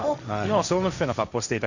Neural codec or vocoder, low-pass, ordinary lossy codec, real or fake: codec, 16 kHz, 1.1 kbps, Voila-Tokenizer; 7.2 kHz; none; fake